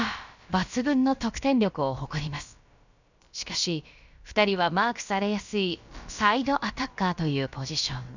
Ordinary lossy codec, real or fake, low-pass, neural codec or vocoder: none; fake; 7.2 kHz; codec, 16 kHz, about 1 kbps, DyCAST, with the encoder's durations